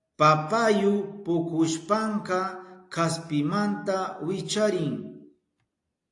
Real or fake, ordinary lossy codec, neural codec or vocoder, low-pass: real; AAC, 48 kbps; none; 10.8 kHz